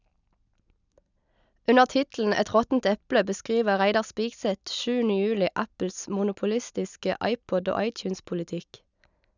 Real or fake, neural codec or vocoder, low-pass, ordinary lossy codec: real; none; 7.2 kHz; none